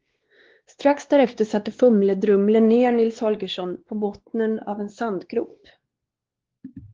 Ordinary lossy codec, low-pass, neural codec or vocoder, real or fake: Opus, 16 kbps; 7.2 kHz; codec, 16 kHz, 2 kbps, X-Codec, WavLM features, trained on Multilingual LibriSpeech; fake